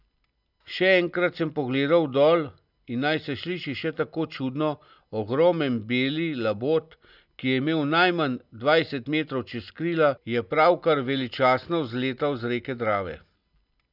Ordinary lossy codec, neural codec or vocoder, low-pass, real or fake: none; none; 5.4 kHz; real